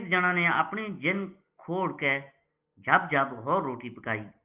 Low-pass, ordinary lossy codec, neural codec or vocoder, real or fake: 3.6 kHz; Opus, 32 kbps; none; real